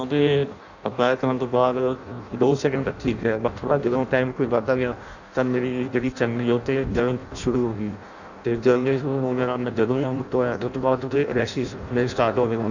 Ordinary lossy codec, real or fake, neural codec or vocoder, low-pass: none; fake; codec, 16 kHz in and 24 kHz out, 0.6 kbps, FireRedTTS-2 codec; 7.2 kHz